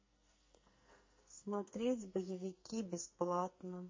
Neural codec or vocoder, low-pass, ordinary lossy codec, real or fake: codec, 44.1 kHz, 2.6 kbps, SNAC; 7.2 kHz; MP3, 32 kbps; fake